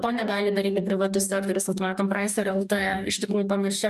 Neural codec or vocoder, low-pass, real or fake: codec, 44.1 kHz, 2.6 kbps, DAC; 14.4 kHz; fake